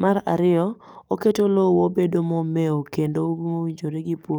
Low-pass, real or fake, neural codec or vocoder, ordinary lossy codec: none; fake; codec, 44.1 kHz, 7.8 kbps, DAC; none